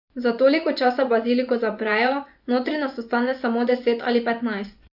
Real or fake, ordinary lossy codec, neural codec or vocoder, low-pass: fake; none; vocoder, 24 kHz, 100 mel bands, Vocos; 5.4 kHz